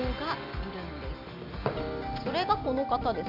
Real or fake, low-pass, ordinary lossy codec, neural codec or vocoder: real; 5.4 kHz; none; none